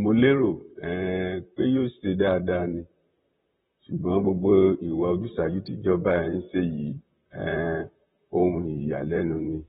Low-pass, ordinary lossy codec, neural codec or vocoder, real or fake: 19.8 kHz; AAC, 16 kbps; vocoder, 44.1 kHz, 128 mel bands, Pupu-Vocoder; fake